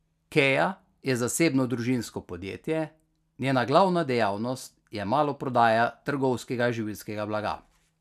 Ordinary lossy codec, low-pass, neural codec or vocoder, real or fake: none; 14.4 kHz; none; real